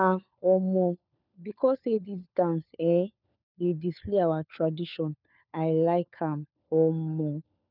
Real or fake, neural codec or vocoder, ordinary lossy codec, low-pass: fake; codec, 16 kHz, 4 kbps, FunCodec, trained on LibriTTS, 50 frames a second; none; 5.4 kHz